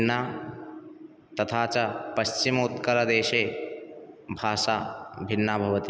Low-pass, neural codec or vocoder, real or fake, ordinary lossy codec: none; none; real; none